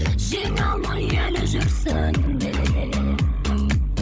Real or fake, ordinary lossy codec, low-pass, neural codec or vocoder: fake; none; none; codec, 16 kHz, 16 kbps, FunCodec, trained on LibriTTS, 50 frames a second